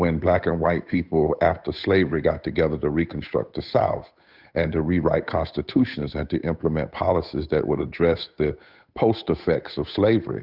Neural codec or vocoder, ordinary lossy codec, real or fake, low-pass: codec, 16 kHz, 8 kbps, FunCodec, trained on Chinese and English, 25 frames a second; Opus, 64 kbps; fake; 5.4 kHz